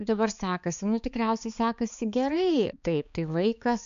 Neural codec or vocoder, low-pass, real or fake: codec, 16 kHz, 4 kbps, X-Codec, HuBERT features, trained on balanced general audio; 7.2 kHz; fake